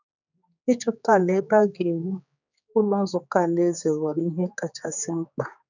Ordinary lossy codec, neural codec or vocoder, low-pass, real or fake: none; codec, 16 kHz, 4 kbps, X-Codec, HuBERT features, trained on general audio; 7.2 kHz; fake